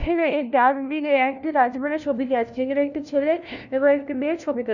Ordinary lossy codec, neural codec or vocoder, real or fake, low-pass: none; codec, 16 kHz, 1 kbps, FunCodec, trained on LibriTTS, 50 frames a second; fake; 7.2 kHz